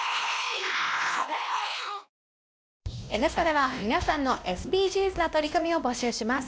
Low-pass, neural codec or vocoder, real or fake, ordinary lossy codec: none; codec, 16 kHz, 1 kbps, X-Codec, WavLM features, trained on Multilingual LibriSpeech; fake; none